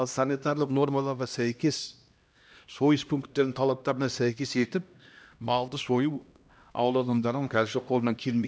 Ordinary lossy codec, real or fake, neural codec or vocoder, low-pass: none; fake; codec, 16 kHz, 1 kbps, X-Codec, HuBERT features, trained on LibriSpeech; none